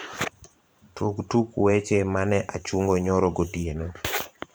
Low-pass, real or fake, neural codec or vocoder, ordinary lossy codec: none; fake; vocoder, 44.1 kHz, 128 mel bands every 512 samples, BigVGAN v2; none